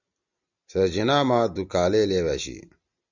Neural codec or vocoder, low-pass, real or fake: none; 7.2 kHz; real